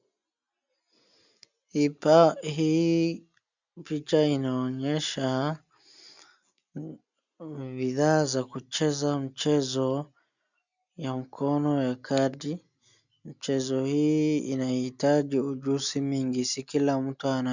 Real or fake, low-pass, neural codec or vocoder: real; 7.2 kHz; none